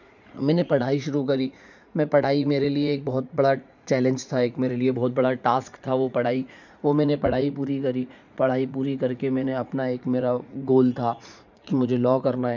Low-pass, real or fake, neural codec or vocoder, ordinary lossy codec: 7.2 kHz; fake; vocoder, 44.1 kHz, 80 mel bands, Vocos; none